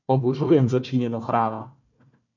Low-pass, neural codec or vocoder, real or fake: 7.2 kHz; codec, 16 kHz, 1 kbps, FunCodec, trained on Chinese and English, 50 frames a second; fake